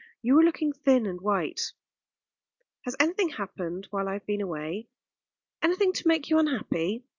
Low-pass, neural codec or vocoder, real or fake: 7.2 kHz; none; real